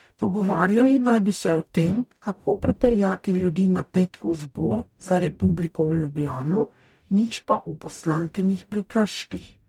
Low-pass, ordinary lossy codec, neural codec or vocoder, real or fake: 19.8 kHz; MP3, 96 kbps; codec, 44.1 kHz, 0.9 kbps, DAC; fake